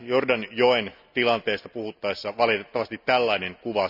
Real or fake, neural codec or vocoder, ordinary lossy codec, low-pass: real; none; none; 5.4 kHz